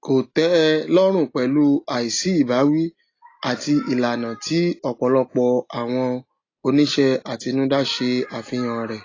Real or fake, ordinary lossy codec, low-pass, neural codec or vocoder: real; AAC, 32 kbps; 7.2 kHz; none